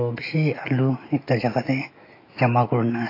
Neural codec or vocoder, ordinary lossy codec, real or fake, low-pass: autoencoder, 48 kHz, 128 numbers a frame, DAC-VAE, trained on Japanese speech; AAC, 24 kbps; fake; 5.4 kHz